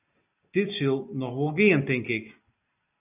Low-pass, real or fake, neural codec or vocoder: 3.6 kHz; real; none